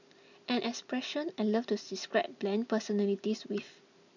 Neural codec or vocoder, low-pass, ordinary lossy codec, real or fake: none; 7.2 kHz; none; real